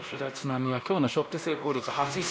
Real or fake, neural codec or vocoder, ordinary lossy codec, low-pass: fake; codec, 16 kHz, 1 kbps, X-Codec, WavLM features, trained on Multilingual LibriSpeech; none; none